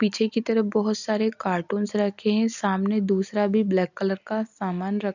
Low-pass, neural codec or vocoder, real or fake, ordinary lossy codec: 7.2 kHz; none; real; none